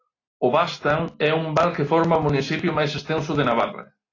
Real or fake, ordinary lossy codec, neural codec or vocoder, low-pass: real; AAC, 32 kbps; none; 7.2 kHz